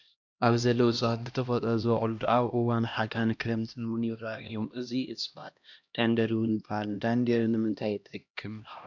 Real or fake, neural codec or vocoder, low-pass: fake; codec, 16 kHz, 1 kbps, X-Codec, HuBERT features, trained on LibriSpeech; 7.2 kHz